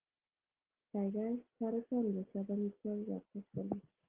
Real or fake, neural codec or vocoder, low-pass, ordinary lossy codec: real; none; 3.6 kHz; Opus, 16 kbps